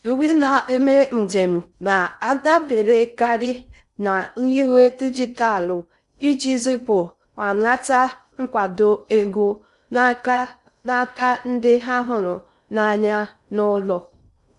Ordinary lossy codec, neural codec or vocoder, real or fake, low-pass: none; codec, 16 kHz in and 24 kHz out, 0.6 kbps, FocalCodec, streaming, 4096 codes; fake; 10.8 kHz